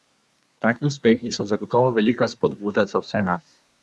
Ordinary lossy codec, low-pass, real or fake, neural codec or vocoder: none; none; fake; codec, 24 kHz, 1 kbps, SNAC